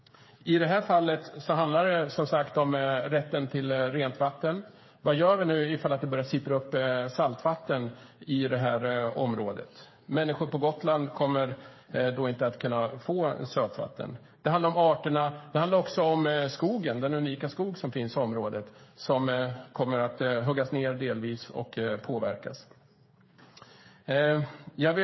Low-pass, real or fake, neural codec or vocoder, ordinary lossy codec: 7.2 kHz; fake; codec, 16 kHz, 8 kbps, FreqCodec, smaller model; MP3, 24 kbps